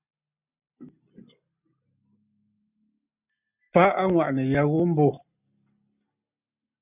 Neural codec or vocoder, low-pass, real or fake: none; 3.6 kHz; real